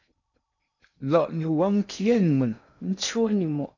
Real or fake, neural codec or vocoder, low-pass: fake; codec, 16 kHz in and 24 kHz out, 0.6 kbps, FocalCodec, streaming, 2048 codes; 7.2 kHz